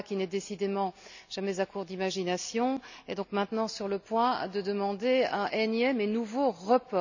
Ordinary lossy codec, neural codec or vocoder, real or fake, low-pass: none; none; real; 7.2 kHz